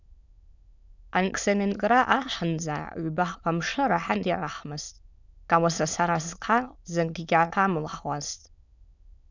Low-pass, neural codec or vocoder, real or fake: 7.2 kHz; autoencoder, 22.05 kHz, a latent of 192 numbers a frame, VITS, trained on many speakers; fake